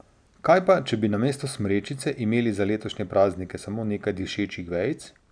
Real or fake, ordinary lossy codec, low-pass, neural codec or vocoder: real; none; 9.9 kHz; none